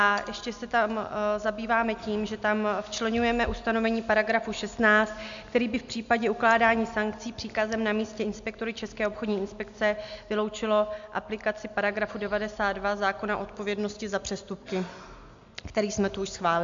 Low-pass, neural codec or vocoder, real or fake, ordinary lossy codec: 7.2 kHz; none; real; MP3, 64 kbps